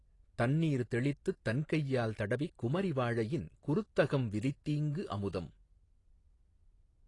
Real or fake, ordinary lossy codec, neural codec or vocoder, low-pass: real; AAC, 32 kbps; none; 10.8 kHz